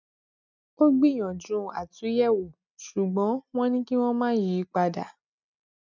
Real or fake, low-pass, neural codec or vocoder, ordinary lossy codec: real; 7.2 kHz; none; none